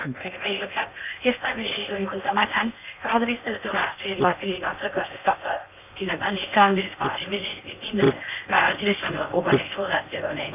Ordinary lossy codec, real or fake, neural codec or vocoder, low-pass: none; fake; codec, 16 kHz in and 24 kHz out, 0.6 kbps, FocalCodec, streaming, 2048 codes; 3.6 kHz